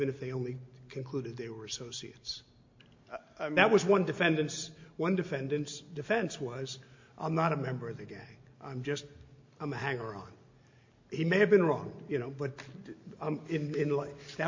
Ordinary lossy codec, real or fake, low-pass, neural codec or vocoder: MP3, 48 kbps; real; 7.2 kHz; none